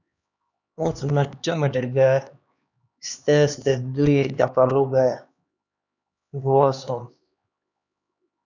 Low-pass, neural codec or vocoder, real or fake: 7.2 kHz; codec, 16 kHz, 2 kbps, X-Codec, HuBERT features, trained on LibriSpeech; fake